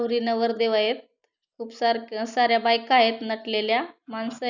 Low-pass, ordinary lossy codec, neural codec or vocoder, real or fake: 7.2 kHz; none; none; real